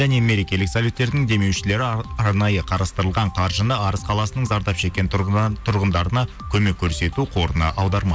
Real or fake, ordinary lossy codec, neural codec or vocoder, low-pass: real; none; none; none